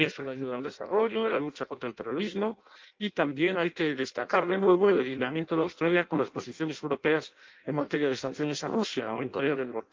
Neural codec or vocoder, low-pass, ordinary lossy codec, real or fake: codec, 16 kHz in and 24 kHz out, 0.6 kbps, FireRedTTS-2 codec; 7.2 kHz; Opus, 32 kbps; fake